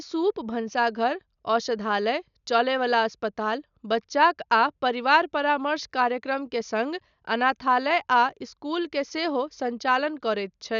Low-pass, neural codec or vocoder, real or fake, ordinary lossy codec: 7.2 kHz; none; real; none